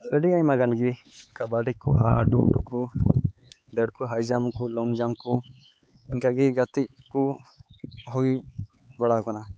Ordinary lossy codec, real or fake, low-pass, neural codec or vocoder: none; fake; none; codec, 16 kHz, 4 kbps, X-Codec, HuBERT features, trained on LibriSpeech